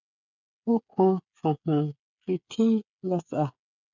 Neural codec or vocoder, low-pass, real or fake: codec, 16 kHz, 8 kbps, FreqCodec, smaller model; 7.2 kHz; fake